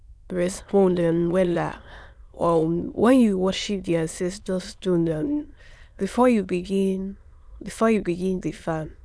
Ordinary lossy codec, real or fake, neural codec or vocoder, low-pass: none; fake; autoencoder, 22.05 kHz, a latent of 192 numbers a frame, VITS, trained on many speakers; none